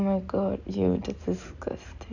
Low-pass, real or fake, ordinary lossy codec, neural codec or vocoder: 7.2 kHz; real; none; none